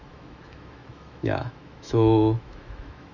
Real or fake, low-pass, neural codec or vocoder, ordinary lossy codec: fake; 7.2 kHz; autoencoder, 48 kHz, 128 numbers a frame, DAC-VAE, trained on Japanese speech; none